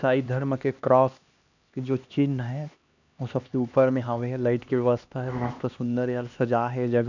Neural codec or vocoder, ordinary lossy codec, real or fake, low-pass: codec, 16 kHz, 2 kbps, X-Codec, HuBERT features, trained on LibriSpeech; none; fake; 7.2 kHz